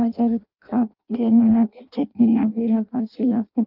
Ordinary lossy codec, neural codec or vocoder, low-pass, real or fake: Opus, 24 kbps; codec, 16 kHz in and 24 kHz out, 0.6 kbps, FireRedTTS-2 codec; 5.4 kHz; fake